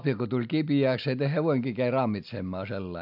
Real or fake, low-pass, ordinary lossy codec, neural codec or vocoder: real; 5.4 kHz; AAC, 48 kbps; none